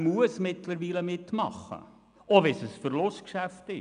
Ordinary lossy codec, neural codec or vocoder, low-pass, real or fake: none; none; 9.9 kHz; real